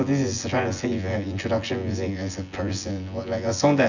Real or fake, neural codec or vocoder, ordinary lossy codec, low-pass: fake; vocoder, 24 kHz, 100 mel bands, Vocos; none; 7.2 kHz